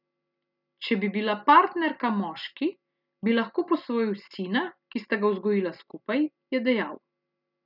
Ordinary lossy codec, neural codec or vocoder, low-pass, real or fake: none; none; 5.4 kHz; real